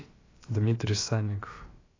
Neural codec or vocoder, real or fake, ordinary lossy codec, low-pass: codec, 16 kHz, about 1 kbps, DyCAST, with the encoder's durations; fake; AAC, 32 kbps; 7.2 kHz